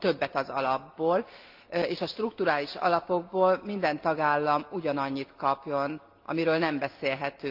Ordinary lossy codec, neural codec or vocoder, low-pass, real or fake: Opus, 32 kbps; none; 5.4 kHz; real